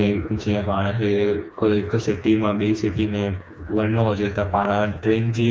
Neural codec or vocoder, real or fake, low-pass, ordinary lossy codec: codec, 16 kHz, 2 kbps, FreqCodec, smaller model; fake; none; none